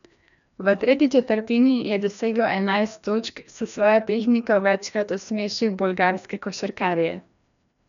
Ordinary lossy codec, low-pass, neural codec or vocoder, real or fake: none; 7.2 kHz; codec, 16 kHz, 1 kbps, FreqCodec, larger model; fake